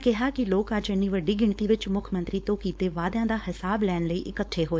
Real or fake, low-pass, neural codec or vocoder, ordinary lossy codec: fake; none; codec, 16 kHz, 4.8 kbps, FACodec; none